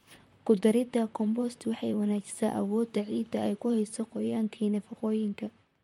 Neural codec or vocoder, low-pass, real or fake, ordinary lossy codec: vocoder, 44.1 kHz, 128 mel bands, Pupu-Vocoder; 19.8 kHz; fake; MP3, 64 kbps